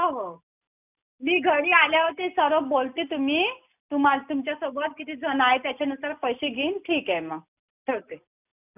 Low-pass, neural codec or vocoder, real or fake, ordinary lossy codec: 3.6 kHz; none; real; none